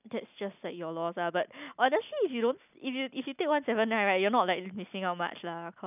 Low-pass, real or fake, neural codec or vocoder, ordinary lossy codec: 3.6 kHz; real; none; none